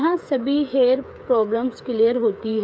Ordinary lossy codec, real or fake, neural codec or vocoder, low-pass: none; fake; codec, 16 kHz, 8 kbps, FreqCodec, smaller model; none